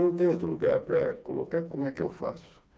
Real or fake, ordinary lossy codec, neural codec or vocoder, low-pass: fake; none; codec, 16 kHz, 2 kbps, FreqCodec, smaller model; none